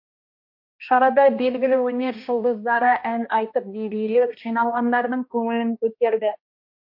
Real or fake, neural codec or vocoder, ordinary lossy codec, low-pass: fake; codec, 16 kHz, 1 kbps, X-Codec, HuBERT features, trained on balanced general audio; MP3, 32 kbps; 5.4 kHz